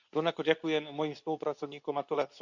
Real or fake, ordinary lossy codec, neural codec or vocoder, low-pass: fake; none; codec, 24 kHz, 0.9 kbps, WavTokenizer, medium speech release version 2; 7.2 kHz